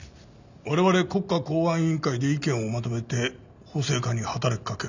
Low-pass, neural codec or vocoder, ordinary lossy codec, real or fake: 7.2 kHz; none; none; real